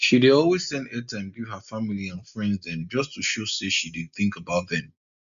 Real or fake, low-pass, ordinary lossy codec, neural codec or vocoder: real; 7.2 kHz; MP3, 64 kbps; none